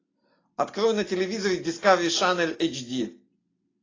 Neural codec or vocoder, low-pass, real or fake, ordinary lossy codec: none; 7.2 kHz; real; AAC, 32 kbps